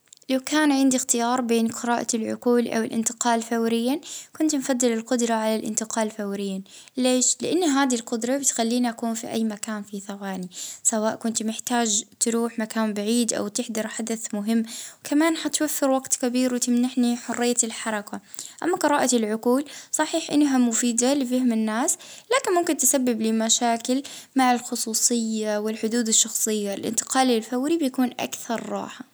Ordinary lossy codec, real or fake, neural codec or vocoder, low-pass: none; real; none; none